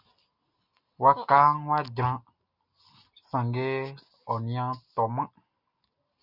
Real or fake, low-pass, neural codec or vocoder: real; 5.4 kHz; none